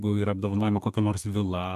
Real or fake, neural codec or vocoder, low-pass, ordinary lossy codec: fake; codec, 32 kHz, 1.9 kbps, SNAC; 14.4 kHz; AAC, 96 kbps